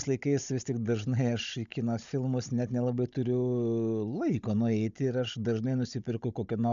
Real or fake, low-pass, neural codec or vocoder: fake; 7.2 kHz; codec, 16 kHz, 16 kbps, FunCodec, trained on Chinese and English, 50 frames a second